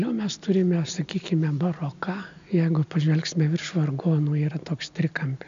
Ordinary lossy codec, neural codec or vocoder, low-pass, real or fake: AAC, 64 kbps; none; 7.2 kHz; real